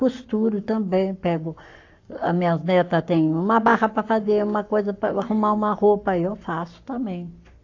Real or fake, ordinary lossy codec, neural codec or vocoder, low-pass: fake; AAC, 48 kbps; codec, 44.1 kHz, 7.8 kbps, Pupu-Codec; 7.2 kHz